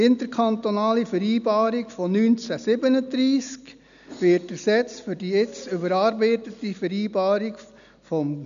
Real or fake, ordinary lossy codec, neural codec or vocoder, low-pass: real; none; none; 7.2 kHz